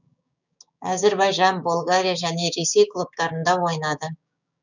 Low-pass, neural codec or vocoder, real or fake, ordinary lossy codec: 7.2 kHz; codec, 16 kHz, 6 kbps, DAC; fake; none